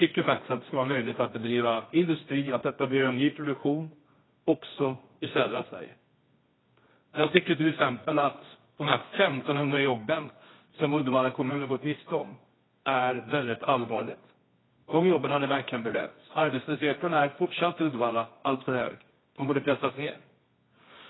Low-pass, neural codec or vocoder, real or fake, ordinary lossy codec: 7.2 kHz; codec, 24 kHz, 0.9 kbps, WavTokenizer, medium music audio release; fake; AAC, 16 kbps